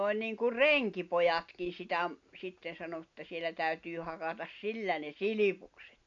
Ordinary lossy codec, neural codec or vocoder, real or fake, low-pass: AAC, 48 kbps; none; real; 7.2 kHz